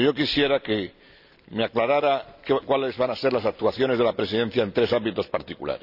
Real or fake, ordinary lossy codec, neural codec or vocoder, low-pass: real; none; none; 5.4 kHz